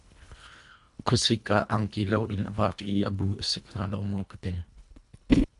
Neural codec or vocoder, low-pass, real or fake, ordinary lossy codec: codec, 24 kHz, 1.5 kbps, HILCodec; 10.8 kHz; fake; MP3, 96 kbps